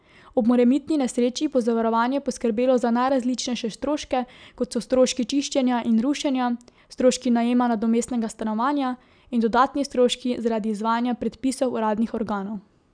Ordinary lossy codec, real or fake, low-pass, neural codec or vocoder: none; real; 9.9 kHz; none